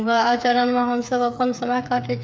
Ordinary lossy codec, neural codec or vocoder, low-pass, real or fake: none; codec, 16 kHz, 8 kbps, FreqCodec, smaller model; none; fake